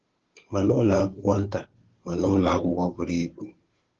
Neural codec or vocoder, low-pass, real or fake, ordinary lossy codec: codec, 16 kHz, 2 kbps, FunCodec, trained on Chinese and English, 25 frames a second; 7.2 kHz; fake; Opus, 16 kbps